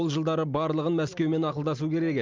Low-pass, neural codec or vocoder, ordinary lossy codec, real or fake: 7.2 kHz; none; Opus, 24 kbps; real